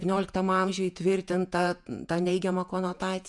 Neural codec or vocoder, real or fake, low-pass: vocoder, 48 kHz, 128 mel bands, Vocos; fake; 10.8 kHz